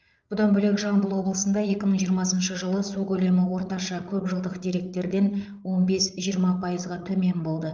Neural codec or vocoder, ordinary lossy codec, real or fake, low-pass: codec, 16 kHz, 8 kbps, FreqCodec, larger model; Opus, 32 kbps; fake; 7.2 kHz